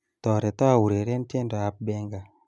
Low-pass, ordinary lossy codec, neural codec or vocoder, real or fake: none; none; none; real